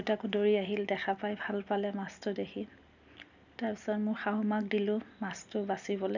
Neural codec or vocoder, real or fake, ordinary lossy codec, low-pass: none; real; none; 7.2 kHz